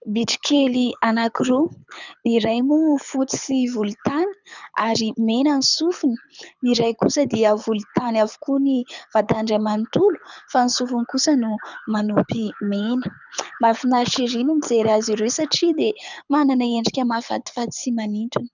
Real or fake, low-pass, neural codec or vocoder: fake; 7.2 kHz; codec, 24 kHz, 6 kbps, HILCodec